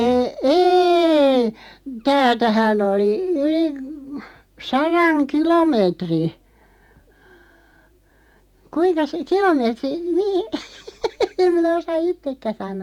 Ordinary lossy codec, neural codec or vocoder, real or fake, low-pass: none; vocoder, 48 kHz, 128 mel bands, Vocos; fake; 19.8 kHz